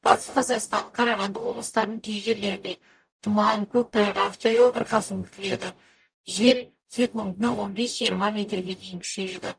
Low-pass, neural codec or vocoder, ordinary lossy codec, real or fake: 9.9 kHz; codec, 44.1 kHz, 0.9 kbps, DAC; none; fake